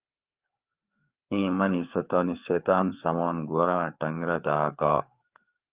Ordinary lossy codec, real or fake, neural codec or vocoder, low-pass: Opus, 24 kbps; fake; codec, 16 kHz, 4 kbps, FreqCodec, larger model; 3.6 kHz